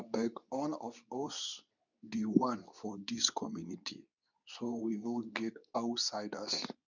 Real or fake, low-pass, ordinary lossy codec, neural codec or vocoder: fake; 7.2 kHz; none; codec, 24 kHz, 0.9 kbps, WavTokenizer, medium speech release version 2